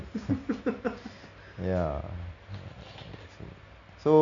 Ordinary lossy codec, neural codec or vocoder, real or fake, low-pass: MP3, 64 kbps; none; real; 7.2 kHz